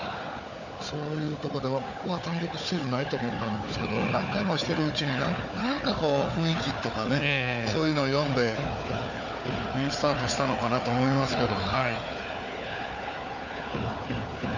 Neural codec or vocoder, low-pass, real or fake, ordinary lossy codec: codec, 16 kHz, 4 kbps, FunCodec, trained on Chinese and English, 50 frames a second; 7.2 kHz; fake; none